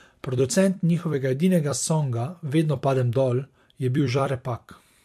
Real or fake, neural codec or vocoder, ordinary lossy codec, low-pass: fake; vocoder, 44.1 kHz, 128 mel bands every 256 samples, BigVGAN v2; MP3, 64 kbps; 14.4 kHz